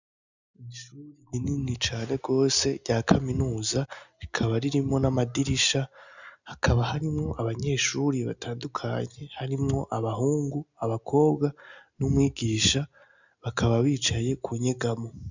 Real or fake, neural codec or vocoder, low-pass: real; none; 7.2 kHz